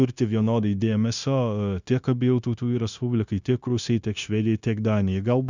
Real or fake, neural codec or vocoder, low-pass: fake; codec, 16 kHz, 0.9 kbps, LongCat-Audio-Codec; 7.2 kHz